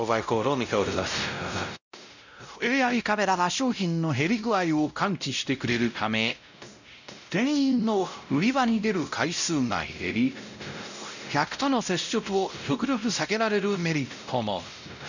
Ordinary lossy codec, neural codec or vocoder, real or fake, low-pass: none; codec, 16 kHz, 0.5 kbps, X-Codec, WavLM features, trained on Multilingual LibriSpeech; fake; 7.2 kHz